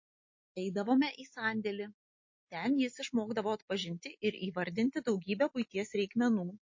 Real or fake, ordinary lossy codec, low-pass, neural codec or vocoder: real; MP3, 32 kbps; 7.2 kHz; none